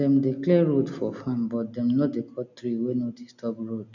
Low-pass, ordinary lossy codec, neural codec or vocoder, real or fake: 7.2 kHz; none; none; real